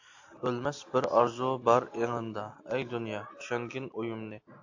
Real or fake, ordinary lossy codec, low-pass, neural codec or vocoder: real; AAC, 48 kbps; 7.2 kHz; none